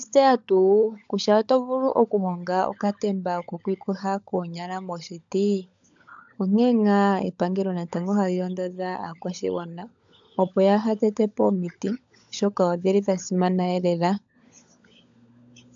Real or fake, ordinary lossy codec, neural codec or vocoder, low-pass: fake; AAC, 64 kbps; codec, 16 kHz, 16 kbps, FunCodec, trained on LibriTTS, 50 frames a second; 7.2 kHz